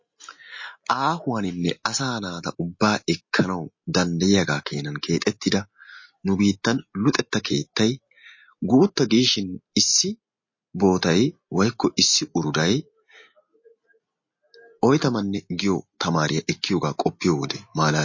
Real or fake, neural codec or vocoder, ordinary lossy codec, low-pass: real; none; MP3, 32 kbps; 7.2 kHz